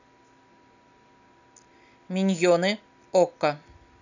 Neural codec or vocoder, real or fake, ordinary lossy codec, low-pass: none; real; none; 7.2 kHz